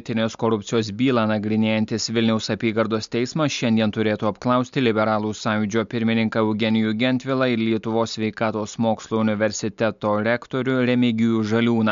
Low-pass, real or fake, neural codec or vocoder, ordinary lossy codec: 7.2 kHz; real; none; MP3, 64 kbps